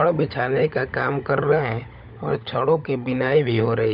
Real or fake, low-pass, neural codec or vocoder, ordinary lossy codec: fake; 5.4 kHz; codec, 16 kHz, 16 kbps, FunCodec, trained on LibriTTS, 50 frames a second; none